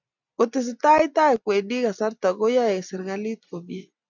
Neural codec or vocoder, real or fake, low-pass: none; real; 7.2 kHz